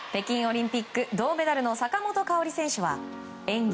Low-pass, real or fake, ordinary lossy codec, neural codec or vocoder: none; real; none; none